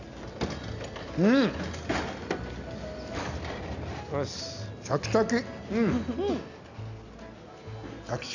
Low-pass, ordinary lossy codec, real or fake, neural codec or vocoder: 7.2 kHz; none; real; none